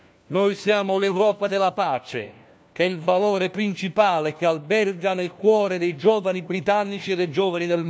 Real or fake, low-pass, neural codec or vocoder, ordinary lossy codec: fake; none; codec, 16 kHz, 1 kbps, FunCodec, trained on LibriTTS, 50 frames a second; none